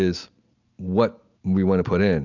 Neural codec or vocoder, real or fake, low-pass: none; real; 7.2 kHz